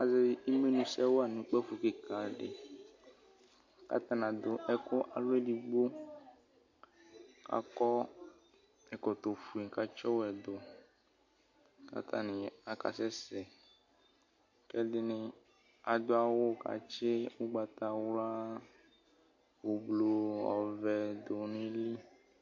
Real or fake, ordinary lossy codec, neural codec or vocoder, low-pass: real; MP3, 48 kbps; none; 7.2 kHz